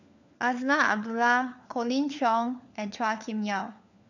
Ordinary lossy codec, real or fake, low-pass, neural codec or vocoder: none; fake; 7.2 kHz; codec, 16 kHz, 4 kbps, FunCodec, trained on LibriTTS, 50 frames a second